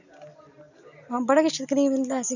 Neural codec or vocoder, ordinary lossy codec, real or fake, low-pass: none; none; real; 7.2 kHz